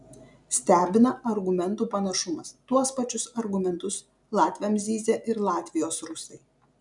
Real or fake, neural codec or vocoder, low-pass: real; none; 10.8 kHz